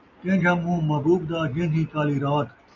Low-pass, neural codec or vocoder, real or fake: 7.2 kHz; none; real